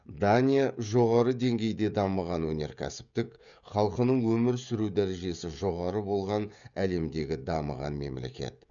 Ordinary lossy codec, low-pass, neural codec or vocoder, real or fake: none; 7.2 kHz; codec, 16 kHz, 16 kbps, FreqCodec, smaller model; fake